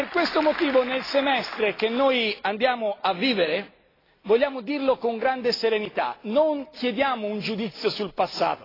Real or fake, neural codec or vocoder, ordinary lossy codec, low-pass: real; none; AAC, 24 kbps; 5.4 kHz